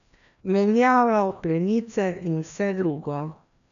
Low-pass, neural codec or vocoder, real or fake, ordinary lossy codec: 7.2 kHz; codec, 16 kHz, 1 kbps, FreqCodec, larger model; fake; none